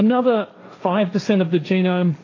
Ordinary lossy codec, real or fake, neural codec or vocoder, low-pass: AAC, 32 kbps; fake; codec, 16 kHz, 1.1 kbps, Voila-Tokenizer; 7.2 kHz